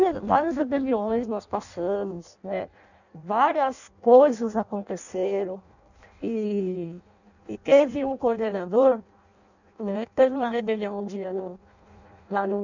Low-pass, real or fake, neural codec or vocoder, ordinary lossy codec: 7.2 kHz; fake; codec, 16 kHz in and 24 kHz out, 0.6 kbps, FireRedTTS-2 codec; none